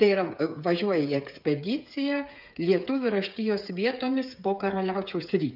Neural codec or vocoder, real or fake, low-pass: codec, 16 kHz, 8 kbps, FreqCodec, smaller model; fake; 5.4 kHz